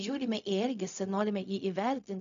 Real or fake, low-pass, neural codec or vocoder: fake; 7.2 kHz; codec, 16 kHz, 0.4 kbps, LongCat-Audio-Codec